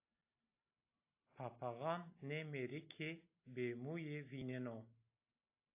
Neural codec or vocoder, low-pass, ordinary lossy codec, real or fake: none; 3.6 kHz; AAC, 32 kbps; real